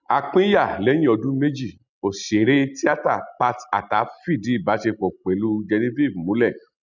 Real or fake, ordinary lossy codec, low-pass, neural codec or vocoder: real; none; 7.2 kHz; none